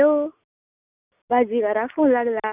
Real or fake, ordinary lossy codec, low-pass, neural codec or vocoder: real; none; 3.6 kHz; none